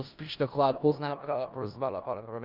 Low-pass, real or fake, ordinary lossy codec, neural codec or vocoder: 5.4 kHz; fake; Opus, 24 kbps; codec, 16 kHz in and 24 kHz out, 0.4 kbps, LongCat-Audio-Codec, four codebook decoder